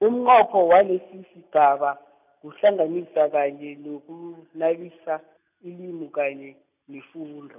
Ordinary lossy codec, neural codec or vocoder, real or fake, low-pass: none; none; real; 3.6 kHz